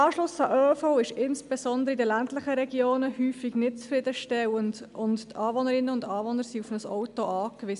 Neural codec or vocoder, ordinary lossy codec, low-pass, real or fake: none; none; 10.8 kHz; real